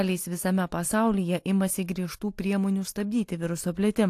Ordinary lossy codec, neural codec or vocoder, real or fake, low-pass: AAC, 64 kbps; none; real; 14.4 kHz